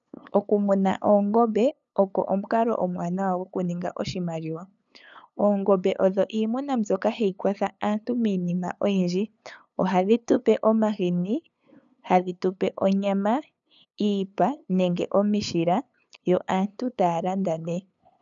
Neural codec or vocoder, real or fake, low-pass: codec, 16 kHz, 8 kbps, FunCodec, trained on LibriTTS, 25 frames a second; fake; 7.2 kHz